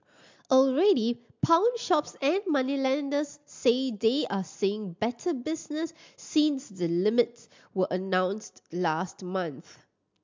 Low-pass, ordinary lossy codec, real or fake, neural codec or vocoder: 7.2 kHz; MP3, 64 kbps; real; none